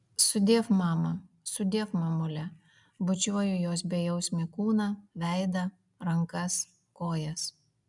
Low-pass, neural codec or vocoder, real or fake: 10.8 kHz; none; real